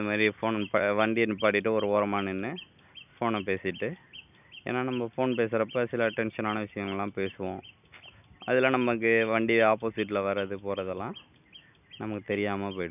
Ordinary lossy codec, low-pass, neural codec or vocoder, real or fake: none; 3.6 kHz; none; real